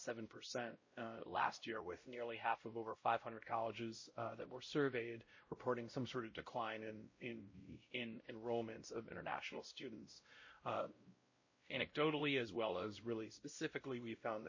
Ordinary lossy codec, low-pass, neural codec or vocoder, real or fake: MP3, 32 kbps; 7.2 kHz; codec, 16 kHz, 0.5 kbps, X-Codec, WavLM features, trained on Multilingual LibriSpeech; fake